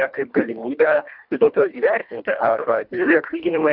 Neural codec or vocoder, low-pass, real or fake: codec, 24 kHz, 1.5 kbps, HILCodec; 5.4 kHz; fake